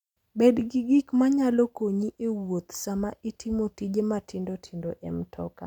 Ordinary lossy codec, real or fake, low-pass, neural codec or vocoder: none; real; 19.8 kHz; none